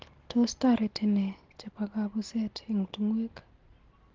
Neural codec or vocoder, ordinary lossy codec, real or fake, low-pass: none; Opus, 16 kbps; real; 7.2 kHz